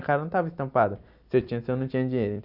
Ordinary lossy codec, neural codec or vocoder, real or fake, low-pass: none; none; real; 5.4 kHz